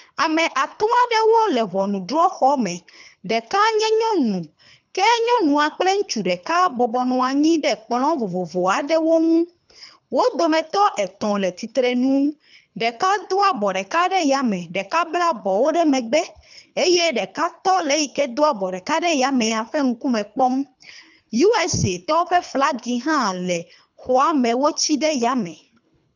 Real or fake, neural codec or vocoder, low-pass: fake; codec, 24 kHz, 3 kbps, HILCodec; 7.2 kHz